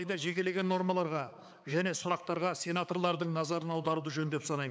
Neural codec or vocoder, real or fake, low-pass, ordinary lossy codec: codec, 16 kHz, 4 kbps, X-Codec, HuBERT features, trained on balanced general audio; fake; none; none